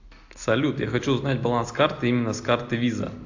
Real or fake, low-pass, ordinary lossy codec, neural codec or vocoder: real; 7.2 kHz; AAC, 48 kbps; none